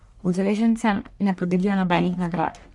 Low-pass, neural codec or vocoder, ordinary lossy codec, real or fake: 10.8 kHz; codec, 44.1 kHz, 1.7 kbps, Pupu-Codec; none; fake